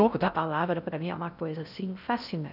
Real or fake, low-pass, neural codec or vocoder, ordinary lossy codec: fake; 5.4 kHz; codec, 16 kHz in and 24 kHz out, 0.6 kbps, FocalCodec, streaming, 4096 codes; none